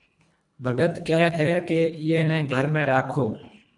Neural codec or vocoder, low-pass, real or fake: codec, 24 kHz, 1.5 kbps, HILCodec; 10.8 kHz; fake